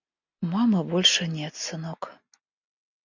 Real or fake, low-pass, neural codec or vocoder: real; 7.2 kHz; none